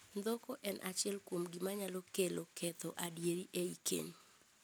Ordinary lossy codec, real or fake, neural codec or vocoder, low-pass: none; real; none; none